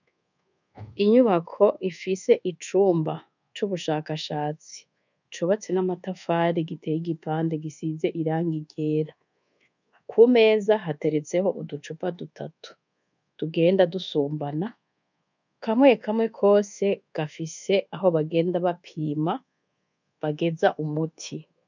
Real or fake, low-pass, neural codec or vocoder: fake; 7.2 kHz; codec, 24 kHz, 1.2 kbps, DualCodec